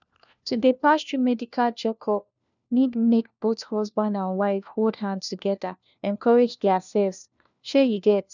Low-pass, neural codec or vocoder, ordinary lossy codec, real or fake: 7.2 kHz; codec, 16 kHz, 1 kbps, FunCodec, trained on LibriTTS, 50 frames a second; none; fake